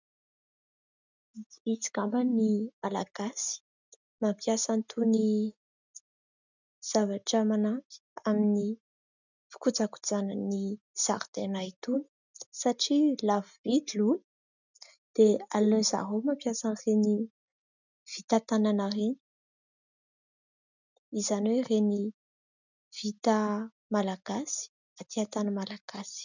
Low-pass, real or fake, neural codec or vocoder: 7.2 kHz; real; none